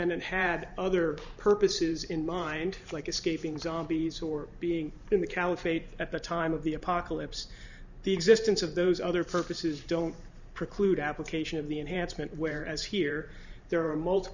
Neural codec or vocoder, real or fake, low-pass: vocoder, 44.1 kHz, 128 mel bands every 512 samples, BigVGAN v2; fake; 7.2 kHz